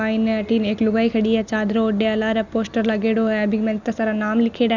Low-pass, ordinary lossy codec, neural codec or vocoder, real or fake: 7.2 kHz; none; none; real